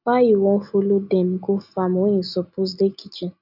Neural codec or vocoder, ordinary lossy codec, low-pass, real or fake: none; none; 5.4 kHz; real